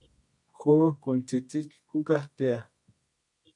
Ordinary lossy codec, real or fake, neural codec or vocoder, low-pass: MP3, 48 kbps; fake; codec, 24 kHz, 0.9 kbps, WavTokenizer, medium music audio release; 10.8 kHz